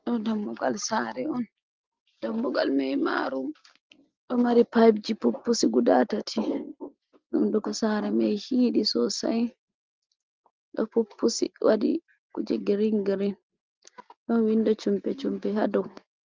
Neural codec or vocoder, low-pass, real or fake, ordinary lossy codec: none; 7.2 kHz; real; Opus, 32 kbps